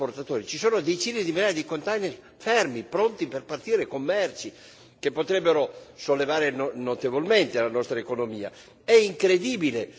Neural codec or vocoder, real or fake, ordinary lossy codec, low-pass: none; real; none; none